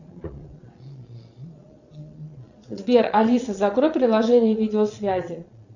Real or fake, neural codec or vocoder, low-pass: fake; vocoder, 22.05 kHz, 80 mel bands, Vocos; 7.2 kHz